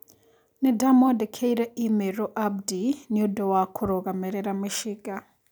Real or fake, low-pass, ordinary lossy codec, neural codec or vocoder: real; none; none; none